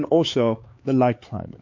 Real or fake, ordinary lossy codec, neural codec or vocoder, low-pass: fake; MP3, 48 kbps; codec, 16 kHz, 4 kbps, X-Codec, HuBERT features, trained on LibriSpeech; 7.2 kHz